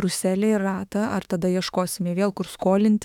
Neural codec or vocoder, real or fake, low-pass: autoencoder, 48 kHz, 32 numbers a frame, DAC-VAE, trained on Japanese speech; fake; 19.8 kHz